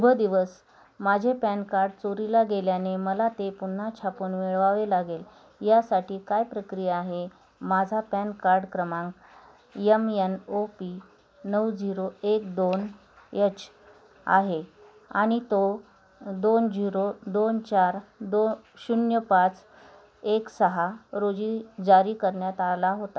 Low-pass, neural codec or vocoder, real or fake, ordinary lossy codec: none; none; real; none